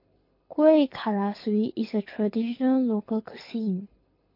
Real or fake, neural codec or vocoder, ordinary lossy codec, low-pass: fake; codec, 24 kHz, 6 kbps, HILCodec; MP3, 32 kbps; 5.4 kHz